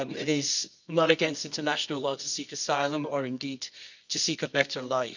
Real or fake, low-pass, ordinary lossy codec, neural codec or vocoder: fake; 7.2 kHz; none; codec, 24 kHz, 0.9 kbps, WavTokenizer, medium music audio release